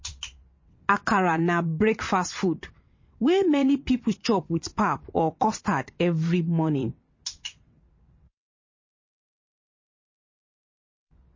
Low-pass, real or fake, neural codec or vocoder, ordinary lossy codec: 7.2 kHz; fake; vocoder, 22.05 kHz, 80 mel bands, WaveNeXt; MP3, 32 kbps